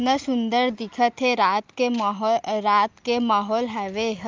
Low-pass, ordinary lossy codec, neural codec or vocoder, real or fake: 7.2 kHz; Opus, 32 kbps; none; real